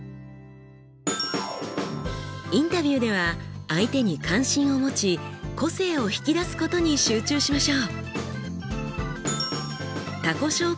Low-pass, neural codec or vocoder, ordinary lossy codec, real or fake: none; none; none; real